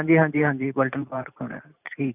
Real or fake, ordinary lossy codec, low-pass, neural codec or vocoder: fake; none; 3.6 kHz; vocoder, 44.1 kHz, 128 mel bands every 512 samples, BigVGAN v2